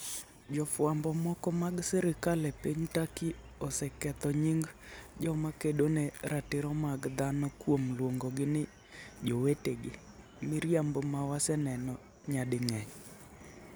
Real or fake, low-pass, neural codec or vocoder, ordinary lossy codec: real; none; none; none